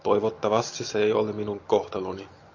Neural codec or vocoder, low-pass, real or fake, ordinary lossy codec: none; 7.2 kHz; real; AAC, 48 kbps